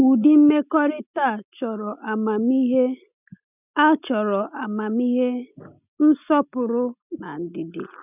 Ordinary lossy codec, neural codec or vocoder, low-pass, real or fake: none; none; 3.6 kHz; real